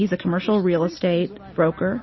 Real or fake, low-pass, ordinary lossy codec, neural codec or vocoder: real; 7.2 kHz; MP3, 24 kbps; none